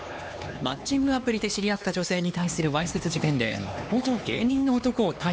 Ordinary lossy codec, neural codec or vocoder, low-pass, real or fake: none; codec, 16 kHz, 2 kbps, X-Codec, HuBERT features, trained on LibriSpeech; none; fake